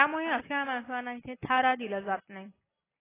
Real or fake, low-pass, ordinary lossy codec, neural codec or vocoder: real; 3.6 kHz; AAC, 16 kbps; none